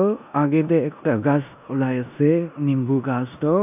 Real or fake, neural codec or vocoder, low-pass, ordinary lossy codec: fake; codec, 16 kHz in and 24 kHz out, 0.9 kbps, LongCat-Audio-Codec, four codebook decoder; 3.6 kHz; none